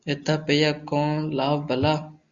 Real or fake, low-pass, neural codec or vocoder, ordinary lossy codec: real; 7.2 kHz; none; Opus, 64 kbps